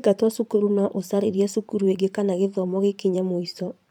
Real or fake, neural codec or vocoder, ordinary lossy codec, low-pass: fake; vocoder, 44.1 kHz, 128 mel bands every 512 samples, BigVGAN v2; none; 19.8 kHz